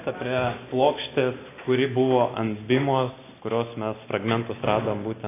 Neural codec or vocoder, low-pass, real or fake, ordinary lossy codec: none; 3.6 kHz; real; AAC, 24 kbps